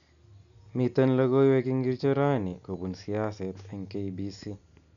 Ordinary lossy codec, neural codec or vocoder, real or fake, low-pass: none; none; real; 7.2 kHz